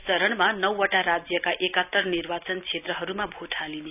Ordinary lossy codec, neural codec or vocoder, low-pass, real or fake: none; none; 3.6 kHz; real